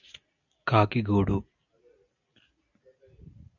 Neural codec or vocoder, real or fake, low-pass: none; real; 7.2 kHz